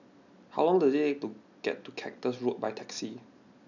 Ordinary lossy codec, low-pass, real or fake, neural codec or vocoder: none; 7.2 kHz; real; none